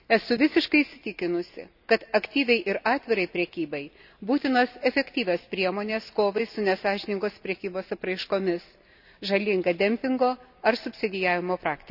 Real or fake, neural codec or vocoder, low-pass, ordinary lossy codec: real; none; 5.4 kHz; none